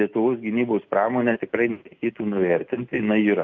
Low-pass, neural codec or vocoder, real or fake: 7.2 kHz; none; real